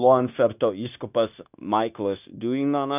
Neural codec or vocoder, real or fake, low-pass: codec, 16 kHz, 0.9 kbps, LongCat-Audio-Codec; fake; 3.6 kHz